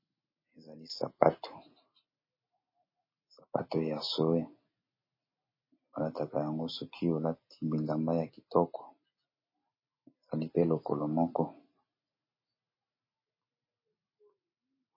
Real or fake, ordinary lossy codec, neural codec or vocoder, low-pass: real; MP3, 24 kbps; none; 5.4 kHz